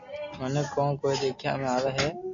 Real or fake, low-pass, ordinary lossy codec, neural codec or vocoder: real; 7.2 kHz; MP3, 64 kbps; none